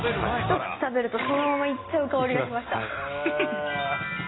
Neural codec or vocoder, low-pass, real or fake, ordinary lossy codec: none; 7.2 kHz; real; AAC, 16 kbps